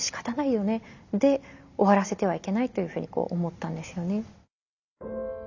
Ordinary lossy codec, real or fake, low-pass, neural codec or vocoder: none; real; 7.2 kHz; none